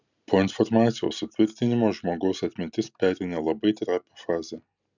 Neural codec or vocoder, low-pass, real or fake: none; 7.2 kHz; real